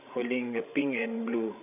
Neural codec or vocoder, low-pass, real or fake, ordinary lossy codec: codec, 16 kHz, 16 kbps, FreqCodec, larger model; 3.6 kHz; fake; none